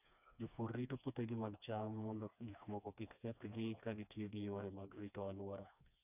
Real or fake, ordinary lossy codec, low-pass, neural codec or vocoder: fake; none; 3.6 kHz; codec, 16 kHz, 2 kbps, FreqCodec, smaller model